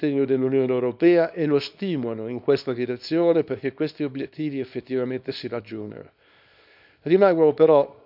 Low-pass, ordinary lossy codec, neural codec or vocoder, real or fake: 5.4 kHz; none; codec, 24 kHz, 0.9 kbps, WavTokenizer, small release; fake